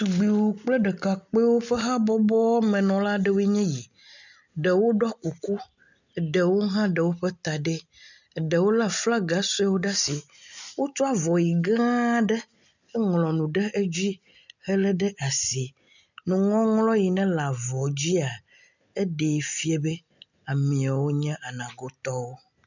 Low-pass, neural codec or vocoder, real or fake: 7.2 kHz; none; real